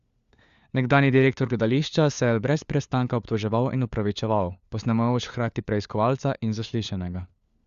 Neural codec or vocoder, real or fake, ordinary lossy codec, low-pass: codec, 16 kHz, 4 kbps, FunCodec, trained on LibriTTS, 50 frames a second; fake; Opus, 64 kbps; 7.2 kHz